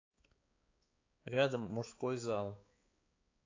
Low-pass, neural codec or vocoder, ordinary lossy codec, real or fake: 7.2 kHz; codec, 16 kHz, 4 kbps, X-Codec, HuBERT features, trained on balanced general audio; AAC, 32 kbps; fake